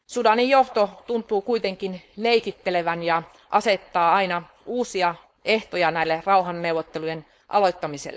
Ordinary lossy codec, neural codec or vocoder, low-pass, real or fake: none; codec, 16 kHz, 4.8 kbps, FACodec; none; fake